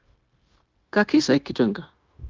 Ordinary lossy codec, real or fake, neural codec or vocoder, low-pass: Opus, 32 kbps; fake; codec, 16 kHz, 0.9 kbps, LongCat-Audio-Codec; 7.2 kHz